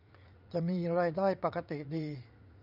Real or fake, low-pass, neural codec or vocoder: fake; 5.4 kHz; vocoder, 44.1 kHz, 80 mel bands, Vocos